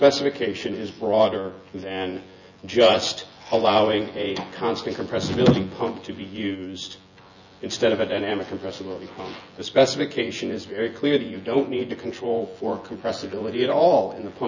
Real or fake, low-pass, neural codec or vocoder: fake; 7.2 kHz; vocoder, 24 kHz, 100 mel bands, Vocos